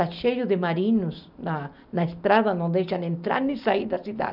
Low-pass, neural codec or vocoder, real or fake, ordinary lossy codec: 5.4 kHz; none; real; none